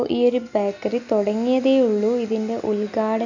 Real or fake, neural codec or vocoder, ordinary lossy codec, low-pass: real; none; none; 7.2 kHz